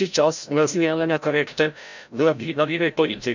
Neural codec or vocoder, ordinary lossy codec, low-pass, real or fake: codec, 16 kHz, 0.5 kbps, FreqCodec, larger model; none; 7.2 kHz; fake